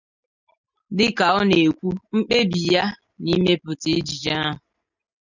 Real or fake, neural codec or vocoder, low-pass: real; none; 7.2 kHz